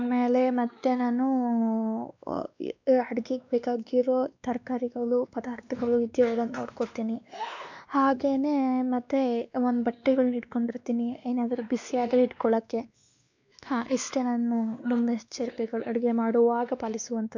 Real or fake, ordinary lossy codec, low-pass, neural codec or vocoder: fake; none; 7.2 kHz; codec, 16 kHz, 2 kbps, X-Codec, WavLM features, trained on Multilingual LibriSpeech